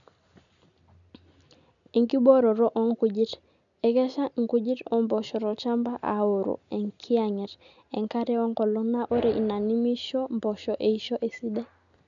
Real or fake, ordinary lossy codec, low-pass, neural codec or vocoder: real; none; 7.2 kHz; none